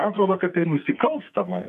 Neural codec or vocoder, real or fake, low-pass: codec, 44.1 kHz, 2.6 kbps, SNAC; fake; 14.4 kHz